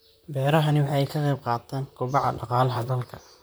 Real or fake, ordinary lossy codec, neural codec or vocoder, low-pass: fake; none; vocoder, 44.1 kHz, 128 mel bands, Pupu-Vocoder; none